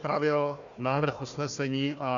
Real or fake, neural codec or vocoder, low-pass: fake; codec, 16 kHz, 1 kbps, FunCodec, trained on Chinese and English, 50 frames a second; 7.2 kHz